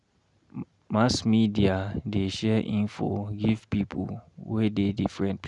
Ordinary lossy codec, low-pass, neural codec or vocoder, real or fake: none; 10.8 kHz; none; real